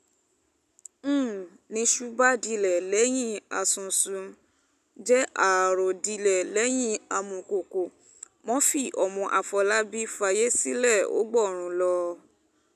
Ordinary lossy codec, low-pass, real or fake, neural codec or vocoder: none; 14.4 kHz; real; none